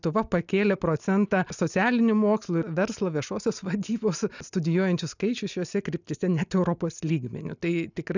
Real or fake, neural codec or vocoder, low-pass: real; none; 7.2 kHz